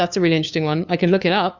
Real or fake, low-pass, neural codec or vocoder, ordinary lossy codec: fake; 7.2 kHz; codec, 16 kHz, 2 kbps, FunCodec, trained on LibriTTS, 25 frames a second; Opus, 64 kbps